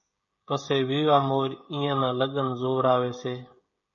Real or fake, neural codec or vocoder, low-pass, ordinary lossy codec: fake; codec, 16 kHz, 16 kbps, FreqCodec, smaller model; 7.2 kHz; MP3, 32 kbps